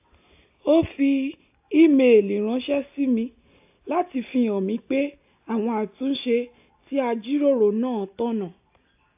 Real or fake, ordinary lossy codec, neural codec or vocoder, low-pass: real; AAC, 32 kbps; none; 3.6 kHz